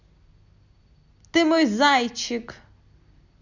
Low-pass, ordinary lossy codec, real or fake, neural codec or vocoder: 7.2 kHz; none; real; none